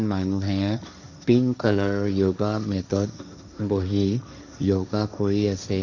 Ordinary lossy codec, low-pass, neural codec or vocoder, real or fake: none; 7.2 kHz; codec, 16 kHz, 1.1 kbps, Voila-Tokenizer; fake